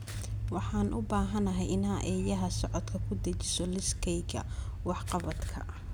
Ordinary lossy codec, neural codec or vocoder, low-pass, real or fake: none; none; none; real